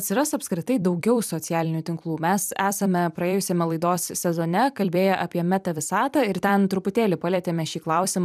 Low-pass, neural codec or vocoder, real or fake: 14.4 kHz; vocoder, 44.1 kHz, 128 mel bands every 256 samples, BigVGAN v2; fake